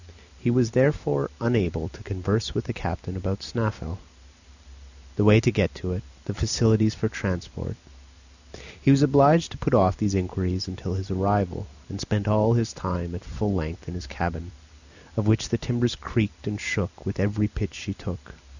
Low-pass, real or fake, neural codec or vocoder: 7.2 kHz; real; none